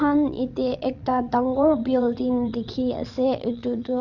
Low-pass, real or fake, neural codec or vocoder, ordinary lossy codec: 7.2 kHz; fake; vocoder, 22.05 kHz, 80 mel bands, WaveNeXt; Opus, 64 kbps